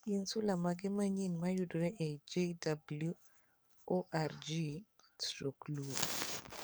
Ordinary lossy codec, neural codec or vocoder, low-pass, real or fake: none; codec, 44.1 kHz, 7.8 kbps, DAC; none; fake